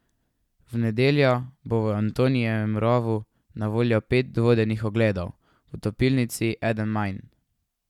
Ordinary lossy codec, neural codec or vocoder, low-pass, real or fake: none; none; 19.8 kHz; real